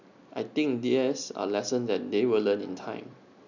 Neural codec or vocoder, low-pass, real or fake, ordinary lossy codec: none; 7.2 kHz; real; none